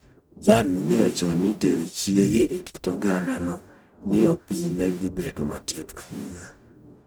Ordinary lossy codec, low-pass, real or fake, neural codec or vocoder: none; none; fake; codec, 44.1 kHz, 0.9 kbps, DAC